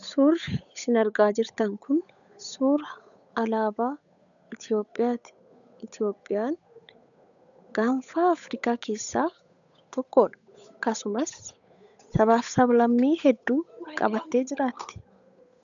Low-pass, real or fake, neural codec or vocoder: 7.2 kHz; fake; codec, 16 kHz, 8 kbps, FunCodec, trained on Chinese and English, 25 frames a second